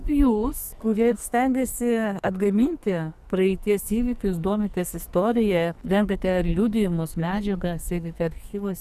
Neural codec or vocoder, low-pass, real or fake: codec, 44.1 kHz, 2.6 kbps, SNAC; 14.4 kHz; fake